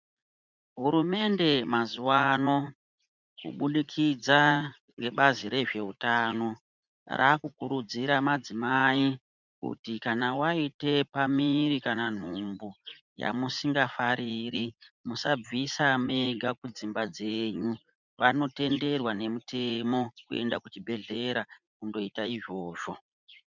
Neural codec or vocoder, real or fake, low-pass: vocoder, 44.1 kHz, 80 mel bands, Vocos; fake; 7.2 kHz